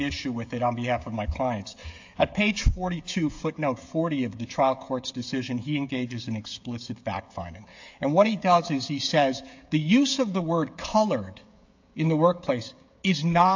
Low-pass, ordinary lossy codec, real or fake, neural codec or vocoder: 7.2 kHz; AAC, 48 kbps; fake; codec, 16 kHz, 16 kbps, FreqCodec, smaller model